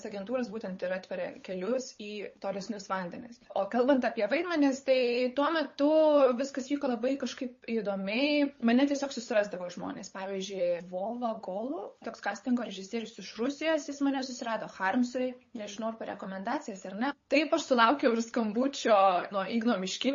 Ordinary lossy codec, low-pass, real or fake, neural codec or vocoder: MP3, 32 kbps; 7.2 kHz; fake; codec, 16 kHz, 8 kbps, FunCodec, trained on LibriTTS, 25 frames a second